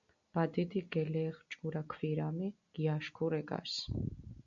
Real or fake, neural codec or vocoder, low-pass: real; none; 7.2 kHz